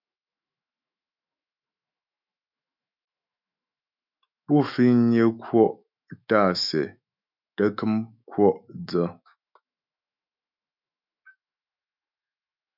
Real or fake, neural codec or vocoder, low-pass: fake; autoencoder, 48 kHz, 128 numbers a frame, DAC-VAE, trained on Japanese speech; 5.4 kHz